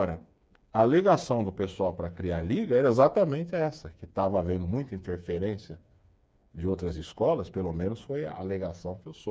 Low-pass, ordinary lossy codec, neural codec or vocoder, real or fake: none; none; codec, 16 kHz, 4 kbps, FreqCodec, smaller model; fake